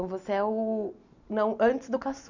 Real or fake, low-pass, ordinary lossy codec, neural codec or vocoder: real; 7.2 kHz; none; none